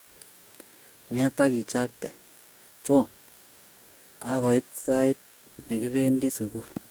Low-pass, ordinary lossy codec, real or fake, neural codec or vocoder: none; none; fake; codec, 44.1 kHz, 2.6 kbps, DAC